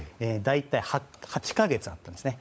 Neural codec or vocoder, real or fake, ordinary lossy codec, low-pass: codec, 16 kHz, 16 kbps, FunCodec, trained on LibriTTS, 50 frames a second; fake; none; none